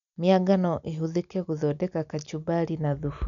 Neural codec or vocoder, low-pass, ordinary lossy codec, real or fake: none; 7.2 kHz; none; real